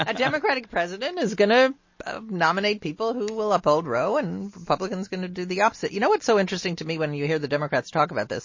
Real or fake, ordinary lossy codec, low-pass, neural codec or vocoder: real; MP3, 32 kbps; 7.2 kHz; none